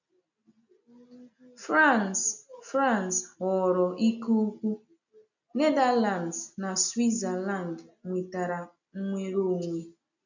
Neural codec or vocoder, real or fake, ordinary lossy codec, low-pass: none; real; none; 7.2 kHz